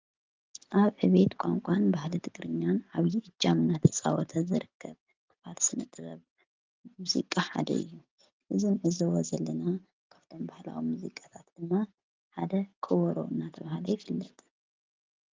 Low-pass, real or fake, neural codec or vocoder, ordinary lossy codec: 7.2 kHz; real; none; Opus, 24 kbps